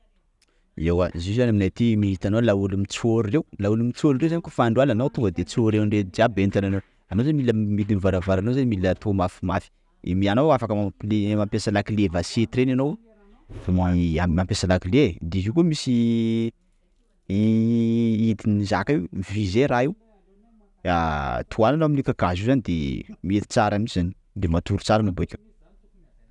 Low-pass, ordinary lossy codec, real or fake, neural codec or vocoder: 10.8 kHz; none; real; none